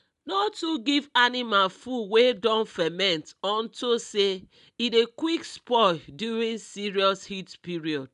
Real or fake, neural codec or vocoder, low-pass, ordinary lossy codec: real; none; 10.8 kHz; none